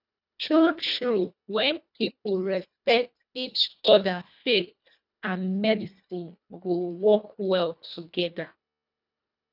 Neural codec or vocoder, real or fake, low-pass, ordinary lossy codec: codec, 24 kHz, 1.5 kbps, HILCodec; fake; 5.4 kHz; none